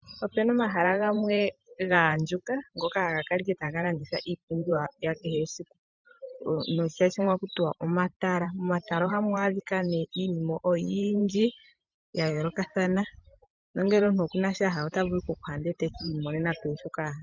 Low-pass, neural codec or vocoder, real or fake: 7.2 kHz; vocoder, 44.1 kHz, 128 mel bands every 512 samples, BigVGAN v2; fake